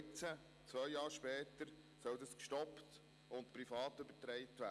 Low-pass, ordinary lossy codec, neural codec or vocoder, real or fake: none; none; none; real